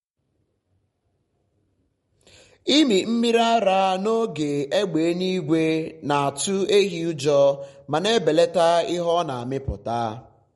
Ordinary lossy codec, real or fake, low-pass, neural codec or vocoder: MP3, 48 kbps; real; 19.8 kHz; none